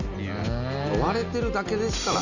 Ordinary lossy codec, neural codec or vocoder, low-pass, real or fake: none; none; 7.2 kHz; real